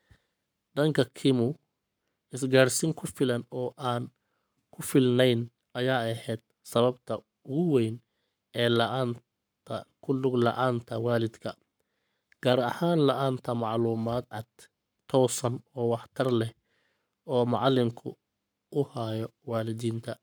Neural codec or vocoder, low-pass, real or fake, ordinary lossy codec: codec, 44.1 kHz, 7.8 kbps, Pupu-Codec; none; fake; none